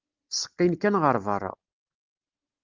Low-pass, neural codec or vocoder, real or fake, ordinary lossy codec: 7.2 kHz; none; real; Opus, 24 kbps